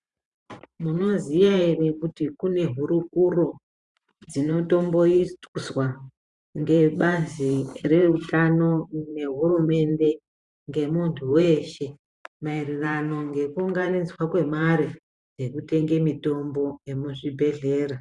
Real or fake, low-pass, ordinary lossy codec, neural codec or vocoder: fake; 10.8 kHz; Opus, 64 kbps; vocoder, 44.1 kHz, 128 mel bands every 512 samples, BigVGAN v2